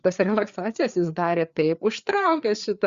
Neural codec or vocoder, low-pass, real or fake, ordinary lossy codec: codec, 16 kHz, 4 kbps, FreqCodec, larger model; 7.2 kHz; fake; Opus, 64 kbps